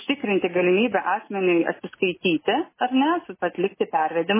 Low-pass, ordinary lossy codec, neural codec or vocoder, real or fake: 3.6 kHz; MP3, 16 kbps; none; real